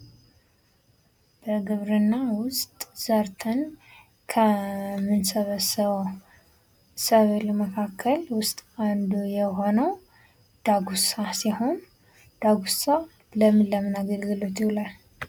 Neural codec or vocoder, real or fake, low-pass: none; real; 19.8 kHz